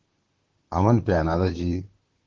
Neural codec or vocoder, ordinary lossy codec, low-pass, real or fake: vocoder, 44.1 kHz, 128 mel bands, Pupu-Vocoder; Opus, 16 kbps; 7.2 kHz; fake